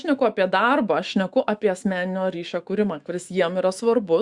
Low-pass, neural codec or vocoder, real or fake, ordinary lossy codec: 10.8 kHz; autoencoder, 48 kHz, 128 numbers a frame, DAC-VAE, trained on Japanese speech; fake; Opus, 64 kbps